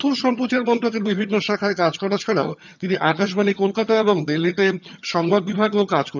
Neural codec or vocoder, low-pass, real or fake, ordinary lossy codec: vocoder, 22.05 kHz, 80 mel bands, HiFi-GAN; 7.2 kHz; fake; none